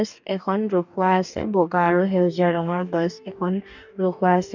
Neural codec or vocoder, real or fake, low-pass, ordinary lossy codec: codec, 44.1 kHz, 2.6 kbps, DAC; fake; 7.2 kHz; none